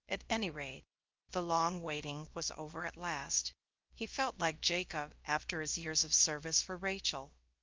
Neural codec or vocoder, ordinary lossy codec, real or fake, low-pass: codec, 16 kHz, about 1 kbps, DyCAST, with the encoder's durations; Opus, 24 kbps; fake; 7.2 kHz